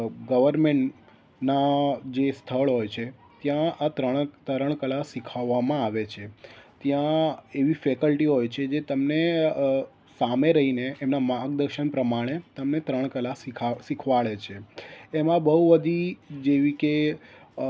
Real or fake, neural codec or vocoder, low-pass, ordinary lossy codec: real; none; none; none